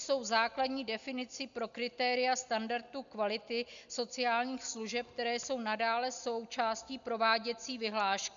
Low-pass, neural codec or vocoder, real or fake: 7.2 kHz; none; real